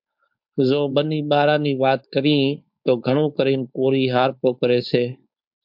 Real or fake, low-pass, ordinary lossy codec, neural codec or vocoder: fake; 5.4 kHz; MP3, 48 kbps; codec, 16 kHz, 4.8 kbps, FACodec